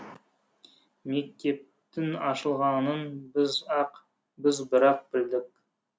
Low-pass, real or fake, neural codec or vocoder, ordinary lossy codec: none; real; none; none